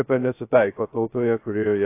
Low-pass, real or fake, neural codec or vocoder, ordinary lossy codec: 3.6 kHz; fake; codec, 16 kHz, 0.2 kbps, FocalCodec; AAC, 24 kbps